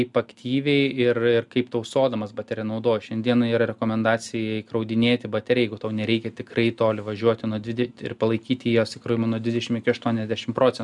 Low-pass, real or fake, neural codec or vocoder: 10.8 kHz; real; none